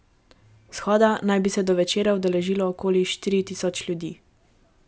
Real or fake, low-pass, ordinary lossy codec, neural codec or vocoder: real; none; none; none